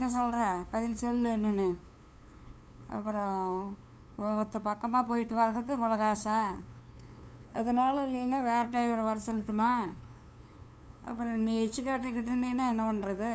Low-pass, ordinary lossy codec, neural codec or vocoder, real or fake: none; none; codec, 16 kHz, 2 kbps, FunCodec, trained on LibriTTS, 25 frames a second; fake